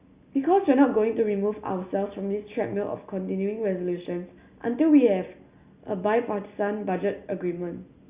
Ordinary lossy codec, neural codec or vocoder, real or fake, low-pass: Opus, 64 kbps; none; real; 3.6 kHz